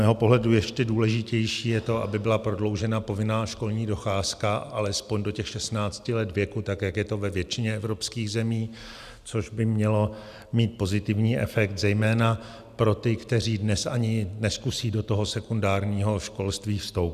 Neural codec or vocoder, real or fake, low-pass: none; real; 14.4 kHz